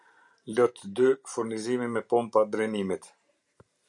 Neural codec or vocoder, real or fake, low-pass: vocoder, 44.1 kHz, 128 mel bands every 512 samples, BigVGAN v2; fake; 10.8 kHz